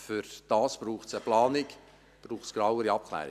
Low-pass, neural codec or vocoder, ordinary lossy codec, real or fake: 14.4 kHz; none; none; real